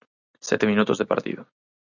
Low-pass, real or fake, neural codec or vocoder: 7.2 kHz; real; none